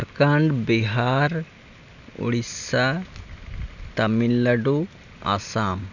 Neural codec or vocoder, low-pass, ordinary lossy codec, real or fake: none; 7.2 kHz; none; real